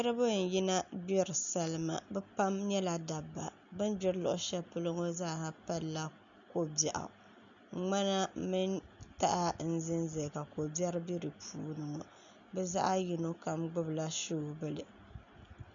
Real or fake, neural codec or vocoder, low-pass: real; none; 7.2 kHz